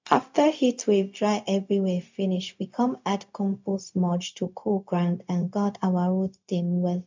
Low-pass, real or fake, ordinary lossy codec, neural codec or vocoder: 7.2 kHz; fake; MP3, 64 kbps; codec, 16 kHz, 0.4 kbps, LongCat-Audio-Codec